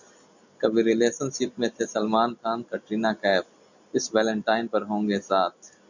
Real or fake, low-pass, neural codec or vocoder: real; 7.2 kHz; none